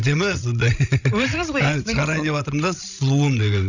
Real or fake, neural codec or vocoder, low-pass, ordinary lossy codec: fake; codec, 16 kHz, 16 kbps, FreqCodec, larger model; 7.2 kHz; none